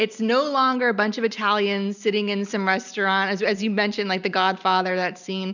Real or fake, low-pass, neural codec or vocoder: real; 7.2 kHz; none